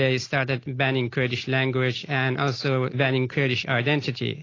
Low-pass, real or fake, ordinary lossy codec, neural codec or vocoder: 7.2 kHz; real; AAC, 32 kbps; none